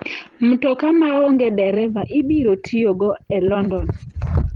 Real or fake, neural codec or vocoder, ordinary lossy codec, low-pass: fake; vocoder, 44.1 kHz, 128 mel bands every 256 samples, BigVGAN v2; Opus, 24 kbps; 19.8 kHz